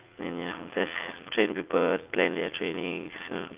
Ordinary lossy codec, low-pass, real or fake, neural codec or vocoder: Opus, 32 kbps; 3.6 kHz; fake; vocoder, 44.1 kHz, 80 mel bands, Vocos